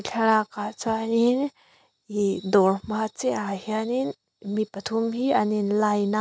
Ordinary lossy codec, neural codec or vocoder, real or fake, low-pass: none; none; real; none